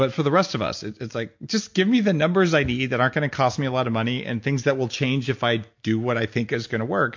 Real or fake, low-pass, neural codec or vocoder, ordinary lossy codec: real; 7.2 kHz; none; MP3, 48 kbps